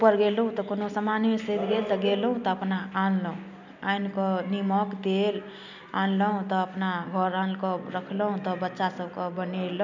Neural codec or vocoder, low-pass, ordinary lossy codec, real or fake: none; 7.2 kHz; none; real